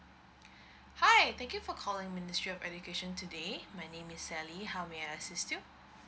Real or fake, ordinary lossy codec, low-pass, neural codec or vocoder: real; none; none; none